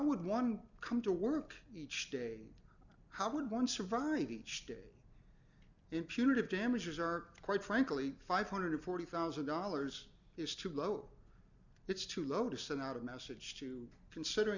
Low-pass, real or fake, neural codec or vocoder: 7.2 kHz; real; none